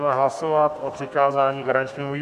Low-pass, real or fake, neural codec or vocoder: 14.4 kHz; fake; codec, 32 kHz, 1.9 kbps, SNAC